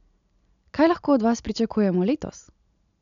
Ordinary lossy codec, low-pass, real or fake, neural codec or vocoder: none; 7.2 kHz; real; none